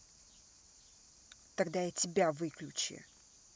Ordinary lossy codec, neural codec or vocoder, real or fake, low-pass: none; none; real; none